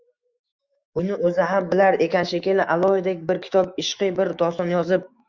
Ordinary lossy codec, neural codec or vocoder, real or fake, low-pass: Opus, 64 kbps; autoencoder, 48 kHz, 128 numbers a frame, DAC-VAE, trained on Japanese speech; fake; 7.2 kHz